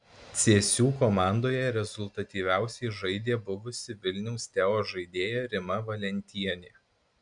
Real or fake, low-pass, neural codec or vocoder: real; 9.9 kHz; none